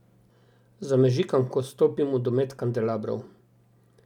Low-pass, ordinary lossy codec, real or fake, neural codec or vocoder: 19.8 kHz; none; real; none